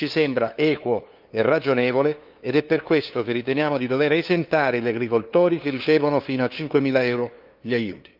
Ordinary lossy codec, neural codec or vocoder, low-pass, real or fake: Opus, 32 kbps; codec, 16 kHz, 2 kbps, FunCodec, trained on LibriTTS, 25 frames a second; 5.4 kHz; fake